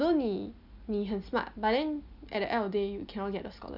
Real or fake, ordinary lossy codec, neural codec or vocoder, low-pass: real; none; none; 5.4 kHz